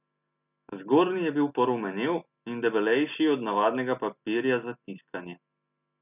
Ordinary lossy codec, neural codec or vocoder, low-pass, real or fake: none; none; 3.6 kHz; real